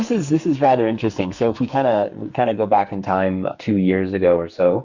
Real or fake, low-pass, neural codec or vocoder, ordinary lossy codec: fake; 7.2 kHz; codec, 44.1 kHz, 2.6 kbps, SNAC; Opus, 64 kbps